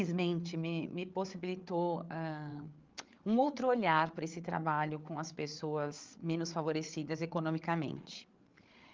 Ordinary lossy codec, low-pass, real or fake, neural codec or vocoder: Opus, 32 kbps; 7.2 kHz; fake; codec, 16 kHz, 8 kbps, FreqCodec, larger model